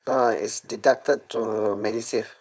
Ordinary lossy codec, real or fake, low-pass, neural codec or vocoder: none; fake; none; codec, 16 kHz, 4.8 kbps, FACodec